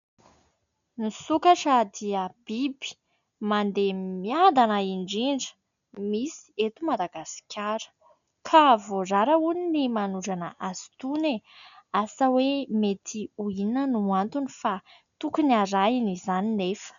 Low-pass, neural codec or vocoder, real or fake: 7.2 kHz; none; real